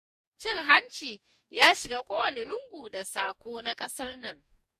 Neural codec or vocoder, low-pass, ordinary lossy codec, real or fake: codec, 44.1 kHz, 2.6 kbps, DAC; 14.4 kHz; MP3, 64 kbps; fake